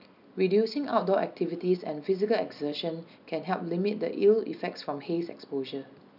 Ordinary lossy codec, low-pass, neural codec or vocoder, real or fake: none; 5.4 kHz; none; real